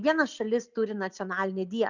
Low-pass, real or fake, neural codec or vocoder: 7.2 kHz; real; none